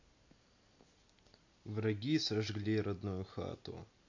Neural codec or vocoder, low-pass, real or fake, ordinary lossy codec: none; 7.2 kHz; real; MP3, 48 kbps